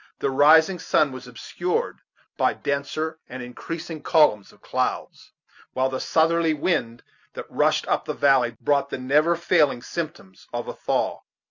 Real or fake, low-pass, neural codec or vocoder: real; 7.2 kHz; none